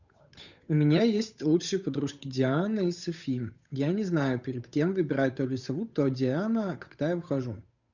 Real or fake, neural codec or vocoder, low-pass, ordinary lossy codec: fake; codec, 16 kHz, 8 kbps, FunCodec, trained on Chinese and English, 25 frames a second; 7.2 kHz; none